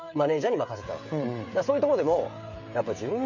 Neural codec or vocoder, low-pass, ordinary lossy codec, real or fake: codec, 16 kHz, 16 kbps, FreqCodec, smaller model; 7.2 kHz; none; fake